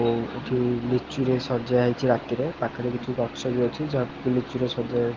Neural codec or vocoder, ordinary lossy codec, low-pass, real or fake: none; Opus, 16 kbps; 7.2 kHz; real